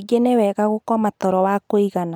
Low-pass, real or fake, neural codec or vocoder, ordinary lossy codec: none; real; none; none